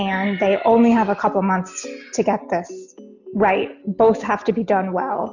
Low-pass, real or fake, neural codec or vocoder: 7.2 kHz; real; none